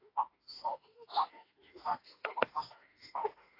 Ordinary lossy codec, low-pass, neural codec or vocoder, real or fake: AAC, 24 kbps; 5.4 kHz; autoencoder, 48 kHz, 32 numbers a frame, DAC-VAE, trained on Japanese speech; fake